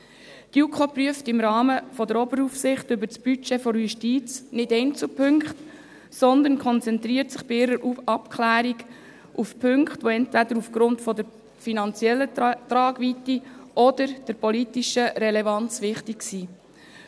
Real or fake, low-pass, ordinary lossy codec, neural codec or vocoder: real; none; none; none